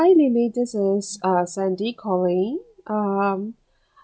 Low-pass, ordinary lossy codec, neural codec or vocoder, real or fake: none; none; none; real